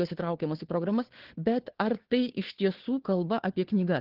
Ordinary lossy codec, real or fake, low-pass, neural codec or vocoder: Opus, 16 kbps; fake; 5.4 kHz; codec, 16 kHz, 2 kbps, FunCodec, trained on Chinese and English, 25 frames a second